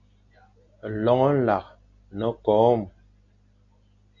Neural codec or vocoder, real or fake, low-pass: none; real; 7.2 kHz